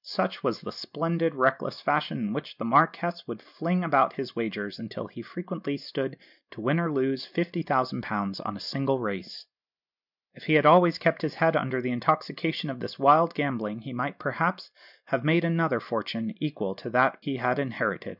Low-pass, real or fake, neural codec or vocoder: 5.4 kHz; real; none